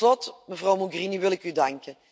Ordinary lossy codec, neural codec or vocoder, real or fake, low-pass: none; none; real; none